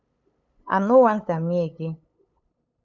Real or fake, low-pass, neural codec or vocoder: fake; 7.2 kHz; codec, 16 kHz, 8 kbps, FunCodec, trained on LibriTTS, 25 frames a second